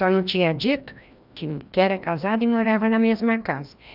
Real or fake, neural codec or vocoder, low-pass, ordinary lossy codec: fake; codec, 16 kHz, 1 kbps, FreqCodec, larger model; 5.4 kHz; none